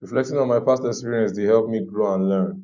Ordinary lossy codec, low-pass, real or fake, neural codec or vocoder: none; 7.2 kHz; real; none